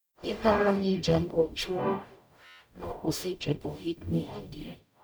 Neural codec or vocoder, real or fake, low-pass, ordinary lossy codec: codec, 44.1 kHz, 0.9 kbps, DAC; fake; none; none